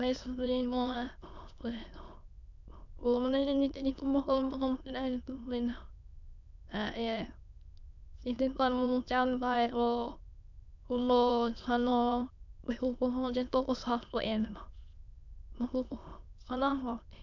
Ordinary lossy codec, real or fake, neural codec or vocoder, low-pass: none; fake; autoencoder, 22.05 kHz, a latent of 192 numbers a frame, VITS, trained on many speakers; 7.2 kHz